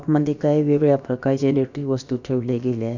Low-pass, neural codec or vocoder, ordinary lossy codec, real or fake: 7.2 kHz; codec, 16 kHz, about 1 kbps, DyCAST, with the encoder's durations; none; fake